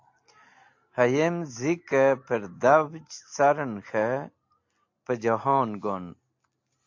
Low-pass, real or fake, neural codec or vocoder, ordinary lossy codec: 7.2 kHz; real; none; AAC, 48 kbps